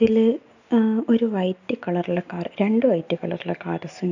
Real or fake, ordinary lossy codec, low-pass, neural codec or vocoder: real; none; 7.2 kHz; none